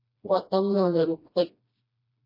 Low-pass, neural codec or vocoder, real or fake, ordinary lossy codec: 5.4 kHz; codec, 16 kHz, 1 kbps, FreqCodec, smaller model; fake; MP3, 32 kbps